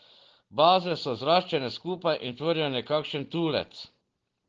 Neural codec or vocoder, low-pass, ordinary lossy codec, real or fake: none; 7.2 kHz; Opus, 16 kbps; real